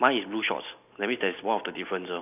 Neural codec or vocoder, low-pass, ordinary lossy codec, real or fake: none; 3.6 kHz; none; real